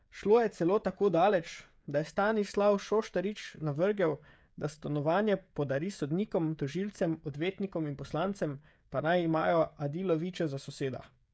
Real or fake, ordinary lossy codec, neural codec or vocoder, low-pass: fake; none; codec, 16 kHz, 16 kbps, FreqCodec, smaller model; none